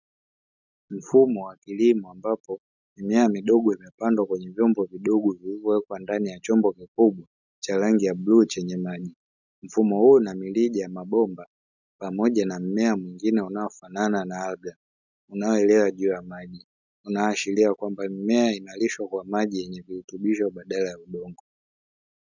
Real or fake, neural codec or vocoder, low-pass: real; none; 7.2 kHz